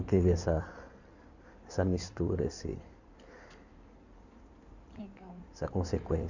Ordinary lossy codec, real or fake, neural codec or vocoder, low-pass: none; fake; codec, 16 kHz in and 24 kHz out, 2.2 kbps, FireRedTTS-2 codec; 7.2 kHz